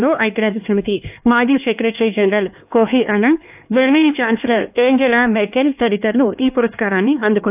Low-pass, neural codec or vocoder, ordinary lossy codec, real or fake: 3.6 kHz; codec, 16 kHz, 2 kbps, X-Codec, HuBERT features, trained on LibriSpeech; AAC, 32 kbps; fake